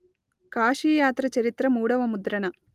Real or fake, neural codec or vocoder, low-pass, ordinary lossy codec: real; none; 14.4 kHz; Opus, 32 kbps